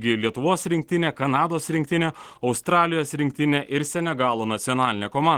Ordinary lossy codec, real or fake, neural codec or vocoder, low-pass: Opus, 16 kbps; real; none; 19.8 kHz